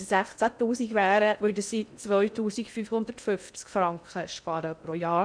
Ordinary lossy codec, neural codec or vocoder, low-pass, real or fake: none; codec, 16 kHz in and 24 kHz out, 0.6 kbps, FocalCodec, streaming, 2048 codes; 9.9 kHz; fake